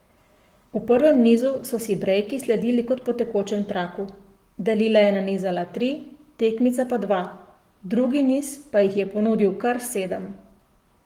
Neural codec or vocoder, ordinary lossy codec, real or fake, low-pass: codec, 44.1 kHz, 7.8 kbps, Pupu-Codec; Opus, 24 kbps; fake; 19.8 kHz